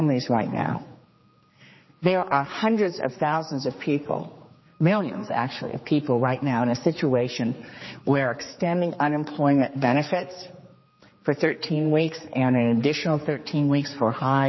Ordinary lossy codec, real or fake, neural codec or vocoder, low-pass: MP3, 24 kbps; fake; codec, 16 kHz, 4 kbps, X-Codec, HuBERT features, trained on general audio; 7.2 kHz